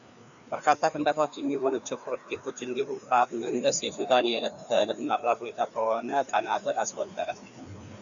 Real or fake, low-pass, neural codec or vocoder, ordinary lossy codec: fake; 7.2 kHz; codec, 16 kHz, 2 kbps, FreqCodec, larger model; MP3, 96 kbps